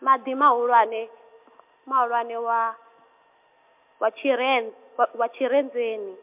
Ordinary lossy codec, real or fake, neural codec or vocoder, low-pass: MP3, 32 kbps; real; none; 3.6 kHz